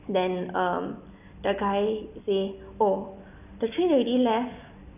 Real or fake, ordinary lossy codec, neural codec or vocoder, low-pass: real; none; none; 3.6 kHz